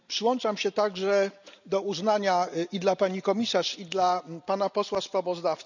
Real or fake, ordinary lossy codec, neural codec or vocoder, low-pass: real; none; none; 7.2 kHz